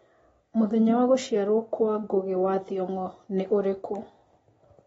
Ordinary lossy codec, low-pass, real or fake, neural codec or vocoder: AAC, 24 kbps; 19.8 kHz; real; none